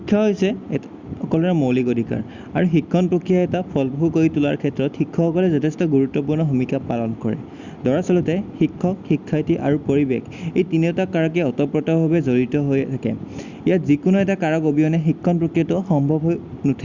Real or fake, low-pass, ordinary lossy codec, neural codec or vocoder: real; 7.2 kHz; Opus, 64 kbps; none